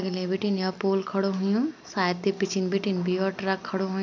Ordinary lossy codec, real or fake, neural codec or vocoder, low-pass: MP3, 64 kbps; real; none; 7.2 kHz